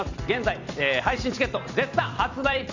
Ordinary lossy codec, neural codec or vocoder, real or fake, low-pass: Opus, 64 kbps; vocoder, 44.1 kHz, 128 mel bands every 256 samples, BigVGAN v2; fake; 7.2 kHz